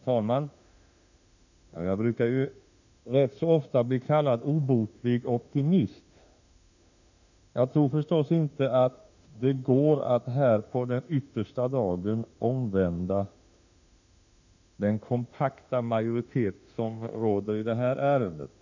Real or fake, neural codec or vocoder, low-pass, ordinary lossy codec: fake; autoencoder, 48 kHz, 32 numbers a frame, DAC-VAE, trained on Japanese speech; 7.2 kHz; none